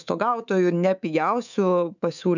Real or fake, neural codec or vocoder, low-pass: fake; codec, 24 kHz, 3.1 kbps, DualCodec; 7.2 kHz